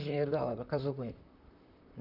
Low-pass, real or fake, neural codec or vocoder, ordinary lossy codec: 5.4 kHz; fake; vocoder, 44.1 kHz, 128 mel bands, Pupu-Vocoder; none